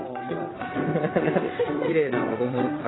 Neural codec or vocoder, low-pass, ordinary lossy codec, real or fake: vocoder, 22.05 kHz, 80 mel bands, WaveNeXt; 7.2 kHz; AAC, 16 kbps; fake